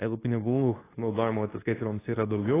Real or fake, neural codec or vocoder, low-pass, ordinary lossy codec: fake; codec, 24 kHz, 0.9 kbps, WavTokenizer, medium speech release version 2; 3.6 kHz; AAC, 16 kbps